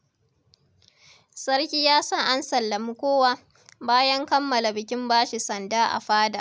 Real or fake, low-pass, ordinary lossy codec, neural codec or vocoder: real; none; none; none